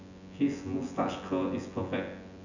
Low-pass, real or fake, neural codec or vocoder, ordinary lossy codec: 7.2 kHz; fake; vocoder, 24 kHz, 100 mel bands, Vocos; none